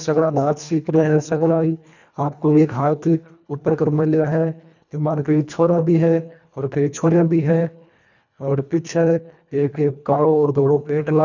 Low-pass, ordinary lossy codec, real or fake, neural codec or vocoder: 7.2 kHz; none; fake; codec, 24 kHz, 1.5 kbps, HILCodec